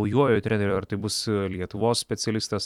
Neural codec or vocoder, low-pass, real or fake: vocoder, 44.1 kHz, 128 mel bands every 256 samples, BigVGAN v2; 19.8 kHz; fake